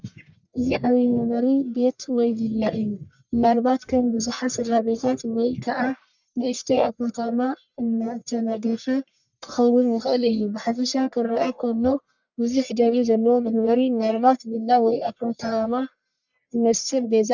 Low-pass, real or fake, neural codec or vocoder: 7.2 kHz; fake; codec, 44.1 kHz, 1.7 kbps, Pupu-Codec